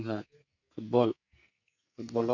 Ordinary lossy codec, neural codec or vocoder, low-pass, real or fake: none; codec, 44.1 kHz, 2.6 kbps, SNAC; 7.2 kHz; fake